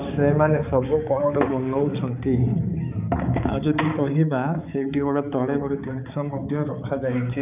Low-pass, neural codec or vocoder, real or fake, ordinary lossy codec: 3.6 kHz; codec, 16 kHz, 4 kbps, X-Codec, HuBERT features, trained on balanced general audio; fake; none